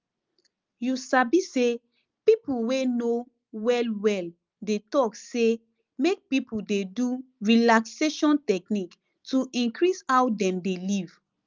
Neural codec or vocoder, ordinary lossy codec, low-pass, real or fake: none; Opus, 24 kbps; 7.2 kHz; real